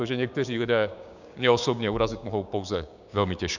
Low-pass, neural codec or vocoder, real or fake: 7.2 kHz; codec, 16 kHz, 6 kbps, DAC; fake